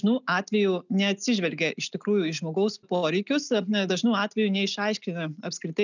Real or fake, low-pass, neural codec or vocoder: real; 7.2 kHz; none